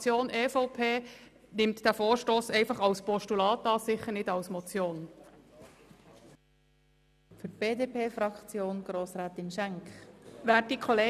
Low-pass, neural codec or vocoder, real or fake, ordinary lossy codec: 14.4 kHz; none; real; none